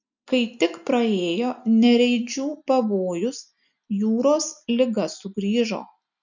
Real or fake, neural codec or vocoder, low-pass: real; none; 7.2 kHz